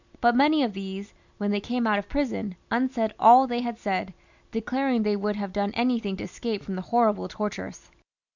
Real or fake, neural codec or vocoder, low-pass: real; none; 7.2 kHz